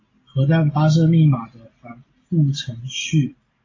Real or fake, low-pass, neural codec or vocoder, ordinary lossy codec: real; 7.2 kHz; none; AAC, 32 kbps